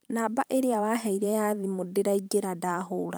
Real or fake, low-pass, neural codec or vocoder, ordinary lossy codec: fake; none; vocoder, 44.1 kHz, 128 mel bands every 512 samples, BigVGAN v2; none